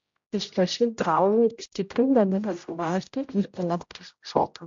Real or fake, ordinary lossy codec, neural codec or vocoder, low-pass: fake; MP3, 48 kbps; codec, 16 kHz, 0.5 kbps, X-Codec, HuBERT features, trained on general audio; 7.2 kHz